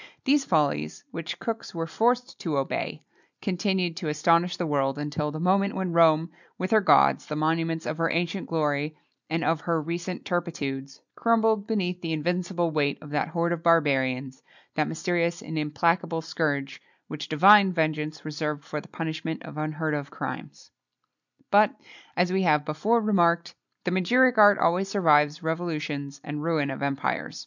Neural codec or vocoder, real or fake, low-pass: none; real; 7.2 kHz